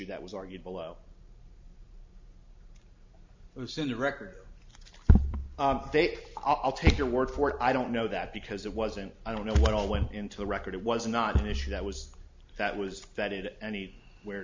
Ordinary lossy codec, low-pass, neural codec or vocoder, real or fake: MP3, 64 kbps; 7.2 kHz; none; real